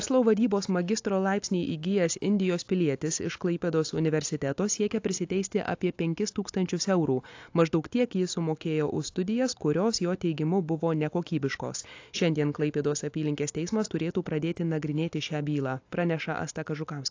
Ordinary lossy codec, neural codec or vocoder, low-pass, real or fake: AAC, 48 kbps; none; 7.2 kHz; real